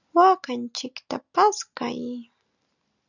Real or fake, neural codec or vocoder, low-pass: real; none; 7.2 kHz